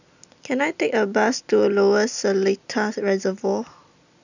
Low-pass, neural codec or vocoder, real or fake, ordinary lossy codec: 7.2 kHz; none; real; none